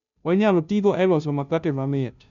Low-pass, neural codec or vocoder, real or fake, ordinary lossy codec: 7.2 kHz; codec, 16 kHz, 0.5 kbps, FunCodec, trained on Chinese and English, 25 frames a second; fake; none